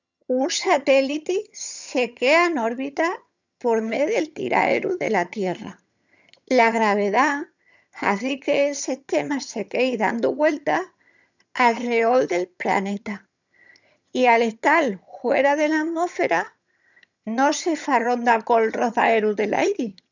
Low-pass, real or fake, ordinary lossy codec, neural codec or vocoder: 7.2 kHz; fake; none; vocoder, 22.05 kHz, 80 mel bands, HiFi-GAN